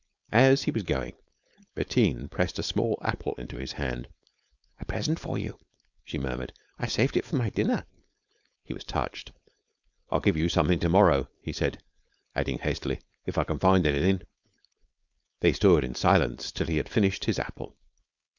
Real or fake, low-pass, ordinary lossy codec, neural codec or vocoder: fake; 7.2 kHz; Opus, 64 kbps; codec, 16 kHz, 4.8 kbps, FACodec